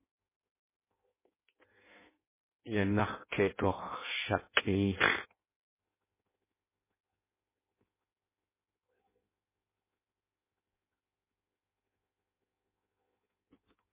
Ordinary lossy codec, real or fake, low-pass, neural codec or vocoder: MP3, 16 kbps; fake; 3.6 kHz; codec, 16 kHz in and 24 kHz out, 0.6 kbps, FireRedTTS-2 codec